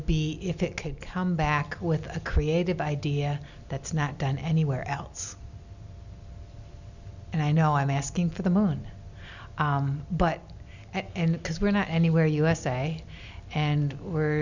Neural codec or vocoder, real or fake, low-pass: none; real; 7.2 kHz